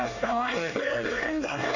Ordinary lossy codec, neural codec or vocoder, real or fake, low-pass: none; codec, 24 kHz, 1 kbps, SNAC; fake; 7.2 kHz